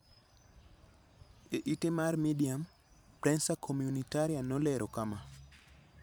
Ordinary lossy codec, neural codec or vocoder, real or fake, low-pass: none; vocoder, 44.1 kHz, 128 mel bands every 512 samples, BigVGAN v2; fake; none